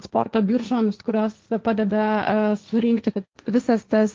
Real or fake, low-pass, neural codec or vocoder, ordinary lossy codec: fake; 7.2 kHz; codec, 16 kHz, 1.1 kbps, Voila-Tokenizer; Opus, 24 kbps